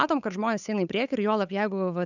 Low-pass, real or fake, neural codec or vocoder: 7.2 kHz; fake; codec, 16 kHz, 8 kbps, FunCodec, trained on Chinese and English, 25 frames a second